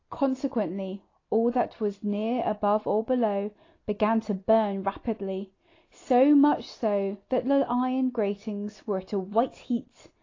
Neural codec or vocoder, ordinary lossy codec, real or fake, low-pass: none; AAC, 32 kbps; real; 7.2 kHz